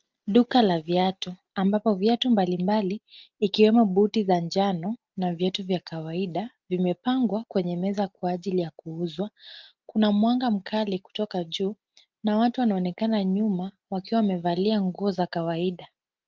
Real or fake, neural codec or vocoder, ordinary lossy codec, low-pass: real; none; Opus, 24 kbps; 7.2 kHz